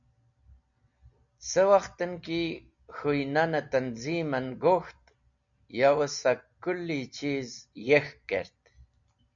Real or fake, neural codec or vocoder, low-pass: real; none; 7.2 kHz